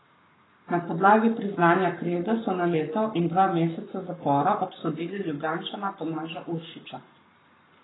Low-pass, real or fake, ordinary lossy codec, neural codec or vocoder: 7.2 kHz; fake; AAC, 16 kbps; codec, 44.1 kHz, 7.8 kbps, Pupu-Codec